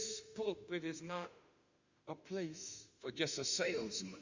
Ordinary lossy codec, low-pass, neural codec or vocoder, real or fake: Opus, 64 kbps; 7.2 kHz; autoencoder, 48 kHz, 32 numbers a frame, DAC-VAE, trained on Japanese speech; fake